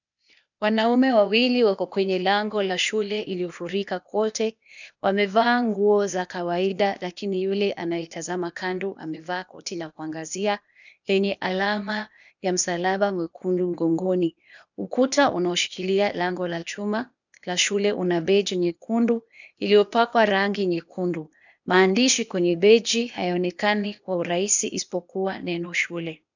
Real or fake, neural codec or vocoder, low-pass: fake; codec, 16 kHz, 0.8 kbps, ZipCodec; 7.2 kHz